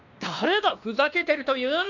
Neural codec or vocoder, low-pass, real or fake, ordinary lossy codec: codec, 16 kHz, 2 kbps, X-Codec, WavLM features, trained on Multilingual LibriSpeech; 7.2 kHz; fake; none